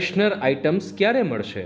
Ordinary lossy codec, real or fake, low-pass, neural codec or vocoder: none; real; none; none